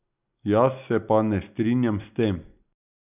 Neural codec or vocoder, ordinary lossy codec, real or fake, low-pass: none; none; real; 3.6 kHz